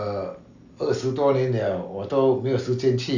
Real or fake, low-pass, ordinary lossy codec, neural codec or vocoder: real; 7.2 kHz; none; none